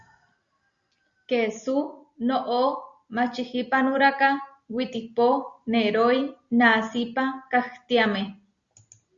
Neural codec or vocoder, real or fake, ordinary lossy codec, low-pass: none; real; Opus, 64 kbps; 7.2 kHz